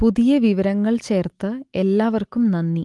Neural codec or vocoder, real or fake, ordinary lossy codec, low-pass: none; real; Opus, 24 kbps; 10.8 kHz